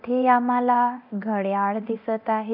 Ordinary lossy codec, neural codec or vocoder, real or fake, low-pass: none; codec, 24 kHz, 0.9 kbps, DualCodec; fake; 5.4 kHz